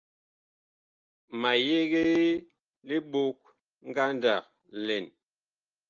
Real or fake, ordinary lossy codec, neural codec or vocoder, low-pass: real; Opus, 24 kbps; none; 7.2 kHz